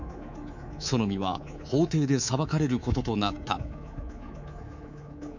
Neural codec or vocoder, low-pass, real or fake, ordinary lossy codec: codec, 24 kHz, 3.1 kbps, DualCodec; 7.2 kHz; fake; none